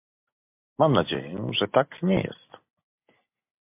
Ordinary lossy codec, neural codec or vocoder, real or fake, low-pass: MP3, 32 kbps; none; real; 3.6 kHz